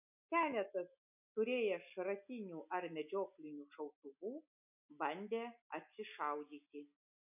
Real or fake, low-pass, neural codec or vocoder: real; 3.6 kHz; none